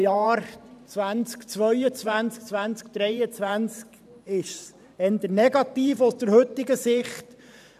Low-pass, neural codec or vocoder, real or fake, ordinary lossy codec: 14.4 kHz; vocoder, 44.1 kHz, 128 mel bands every 512 samples, BigVGAN v2; fake; none